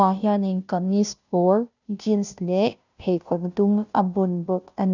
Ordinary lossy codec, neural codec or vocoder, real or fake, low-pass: none; codec, 16 kHz, 0.5 kbps, FunCodec, trained on Chinese and English, 25 frames a second; fake; 7.2 kHz